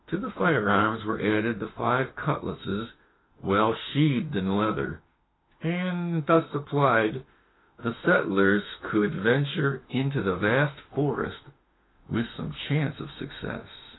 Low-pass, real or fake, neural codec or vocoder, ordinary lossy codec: 7.2 kHz; fake; autoencoder, 48 kHz, 32 numbers a frame, DAC-VAE, trained on Japanese speech; AAC, 16 kbps